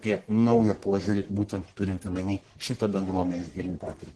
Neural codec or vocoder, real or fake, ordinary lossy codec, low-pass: codec, 44.1 kHz, 1.7 kbps, Pupu-Codec; fake; Opus, 16 kbps; 10.8 kHz